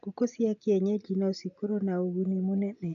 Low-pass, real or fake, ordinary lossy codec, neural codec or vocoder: 7.2 kHz; real; none; none